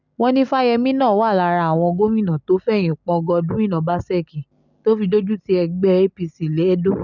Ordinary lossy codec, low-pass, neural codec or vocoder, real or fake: none; 7.2 kHz; none; real